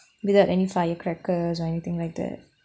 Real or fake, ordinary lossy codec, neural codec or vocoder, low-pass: real; none; none; none